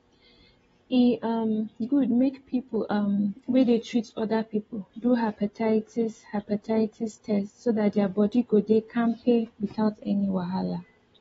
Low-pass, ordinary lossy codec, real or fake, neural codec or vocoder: 10.8 kHz; AAC, 24 kbps; real; none